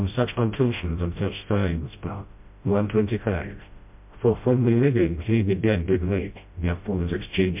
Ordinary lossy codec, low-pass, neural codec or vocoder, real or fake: MP3, 32 kbps; 3.6 kHz; codec, 16 kHz, 0.5 kbps, FreqCodec, smaller model; fake